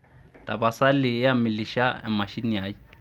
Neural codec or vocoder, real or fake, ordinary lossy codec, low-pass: none; real; Opus, 32 kbps; 19.8 kHz